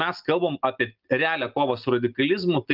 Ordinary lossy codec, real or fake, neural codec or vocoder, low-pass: Opus, 24 kbps; real; none; 5.4 kHz